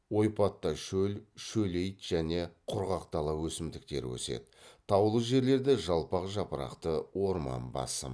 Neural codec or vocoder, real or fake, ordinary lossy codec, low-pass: none; real; none; none